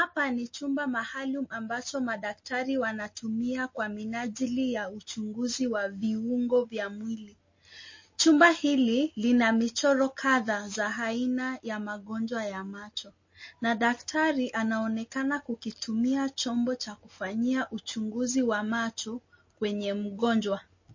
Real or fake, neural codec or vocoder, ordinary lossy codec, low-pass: real; none; MP3, 32 kbps; 7.2 kHz